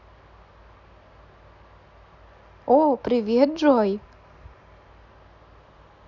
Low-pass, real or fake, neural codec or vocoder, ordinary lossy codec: 7.2 kHz; real; none; none